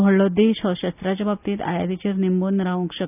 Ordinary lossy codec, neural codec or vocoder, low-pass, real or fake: none; none; 3.6 kHz; real